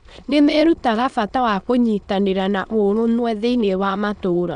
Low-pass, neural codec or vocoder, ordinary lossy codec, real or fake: 9.9 kHz; autoencoder, 22.05 kHz, a latent of 192 numbers a frame, VITS, trained on many speakers; none; fake